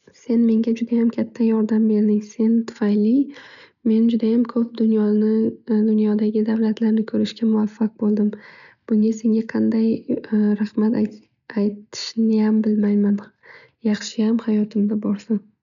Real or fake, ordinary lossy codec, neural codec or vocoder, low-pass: real; none; none; 7.2 kHz